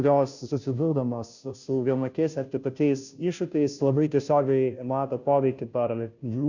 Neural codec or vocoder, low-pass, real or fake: codec, 16 kHz, 0.5 kbps, FunCodec, trained on Chinese and English, 25 frames a second; 7.2 kHz; fake